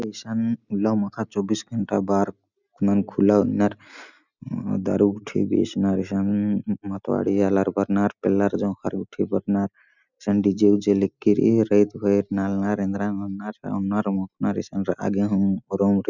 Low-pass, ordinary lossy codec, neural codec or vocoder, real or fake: 7.2 kHz; none; none; real